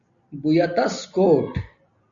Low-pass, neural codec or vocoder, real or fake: 7.2 kHz; none; real